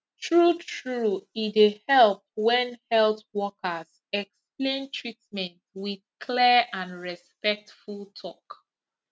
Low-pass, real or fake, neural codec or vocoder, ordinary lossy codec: none; real; none; none